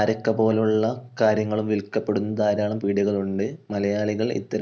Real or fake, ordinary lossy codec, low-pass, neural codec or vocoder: real; none; none; none